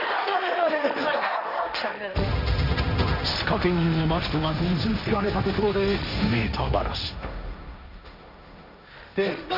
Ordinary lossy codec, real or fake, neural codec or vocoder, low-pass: none; fake; codec, 16 kHz, 1.1 kbps, Voila-Tokenizer; 5.4 kHz